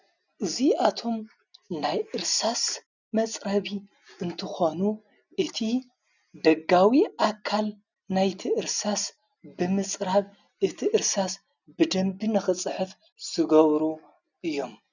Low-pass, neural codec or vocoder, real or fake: 7.2 kHz; none; real